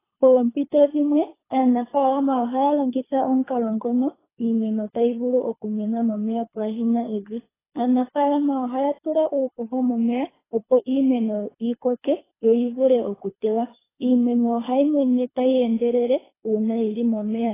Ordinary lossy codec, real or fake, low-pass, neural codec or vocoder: AAC, 16 kbps; fake; 3.6 kHz; codec, 24 kHz, 3 kbps, HILCodec